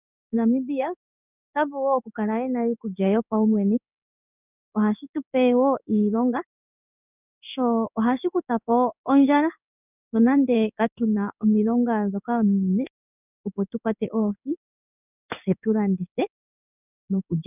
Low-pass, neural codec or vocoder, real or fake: 3.6 kHz; codec, 16 kHz in and 24 kHz out, 1 kbps, XY-Tokenizer; fake